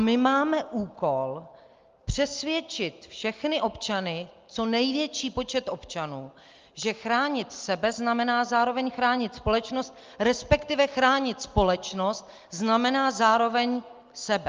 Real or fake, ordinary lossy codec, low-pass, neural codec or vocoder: real; Opus, 32 kbps; 7.2 kHz; none